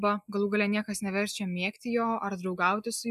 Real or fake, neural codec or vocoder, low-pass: real; none; 14.4 kHz